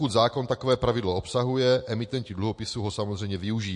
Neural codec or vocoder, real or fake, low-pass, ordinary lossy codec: none; real; 10.8 kHz; MP3, 48 kbps